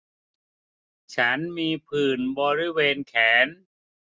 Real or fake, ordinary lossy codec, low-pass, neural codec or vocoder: real; none; none; none